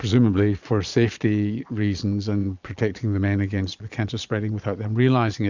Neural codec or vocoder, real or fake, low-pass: vocoder, 22.05 kHz, 80 mel bands, Vocos; fake; 7.2 kHz